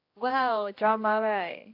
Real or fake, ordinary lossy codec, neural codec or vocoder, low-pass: fake; AAC, 32 kbps; codec, 16 kHz, 1 kbps, X-Codec, HuBERT features, trained on general audio; 5.4 kHz